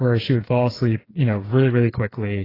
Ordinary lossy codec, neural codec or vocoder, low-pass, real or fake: AAC, 24 kbps; codec, 16 kHz, 4 kbps, FreqCodec, smaller model; 5.4 kHz; fake